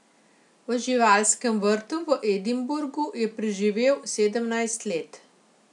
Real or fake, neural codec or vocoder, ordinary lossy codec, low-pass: real; none; none; 10.8 kHz